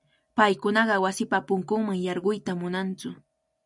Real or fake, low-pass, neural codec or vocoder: real; 10.8 kHz; none